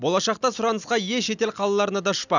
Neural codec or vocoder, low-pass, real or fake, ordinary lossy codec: none; 7.2 kHz; real; none